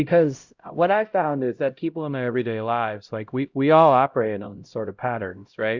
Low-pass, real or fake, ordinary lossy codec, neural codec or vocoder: 7.2 kHz; fake; Opus, 64 kbps; codec, 16 kHz, 0.5 kbps, X-Codec, HuBERT features, trained on LibriSpeech